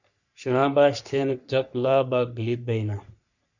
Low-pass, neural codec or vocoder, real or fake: 7.2 kHz; codec, 44.1 kHz, 3.4 kbps, Pupu-Codec; fake